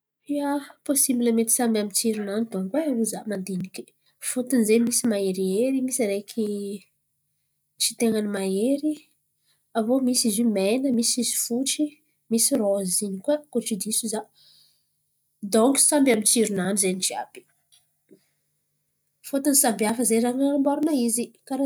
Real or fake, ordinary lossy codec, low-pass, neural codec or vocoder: real; none; none; none